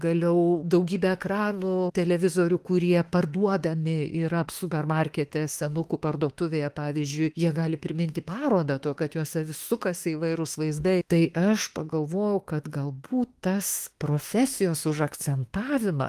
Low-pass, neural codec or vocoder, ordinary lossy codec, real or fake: 14.4 kHz; autoencoder, 48 kHz, 32 numbers a frame, DAC-VAE, trained on Japanese speech; Opus, 24 kbps; fake